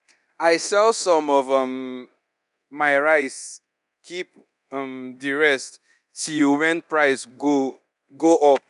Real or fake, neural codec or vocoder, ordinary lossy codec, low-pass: fake; codec, 24 kHz, 0.9 kbps, DualCodec; none; 10.8 kHz